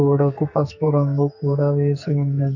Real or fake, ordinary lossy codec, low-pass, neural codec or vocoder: fake; none; 7.2 kHz; codec, 44.1 kHz, 2.6 kbps, SNAC